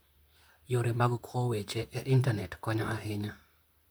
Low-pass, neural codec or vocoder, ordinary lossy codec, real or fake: none; vocoder, 44.1 kHz, 128 mel bands, Pupu-Vocoder; none; fake